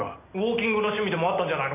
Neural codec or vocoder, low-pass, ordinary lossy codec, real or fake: none; 3.6 kHz; AAC, 32 kbps; real